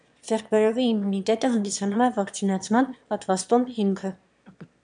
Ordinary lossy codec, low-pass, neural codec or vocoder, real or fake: MP3, 96 kbps; 9.9 kHz; autoencoder, 22.05 kHz, a latent of 192 numbers a frame, VITS, trained on one speaker; fake